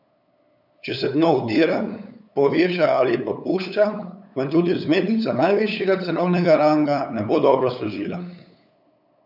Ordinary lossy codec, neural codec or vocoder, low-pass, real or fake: none; codec, 16 kHz, 8 kbps, FunCodec, trained on LibriTTS, 25 frames a second; 5.4 kHz; fake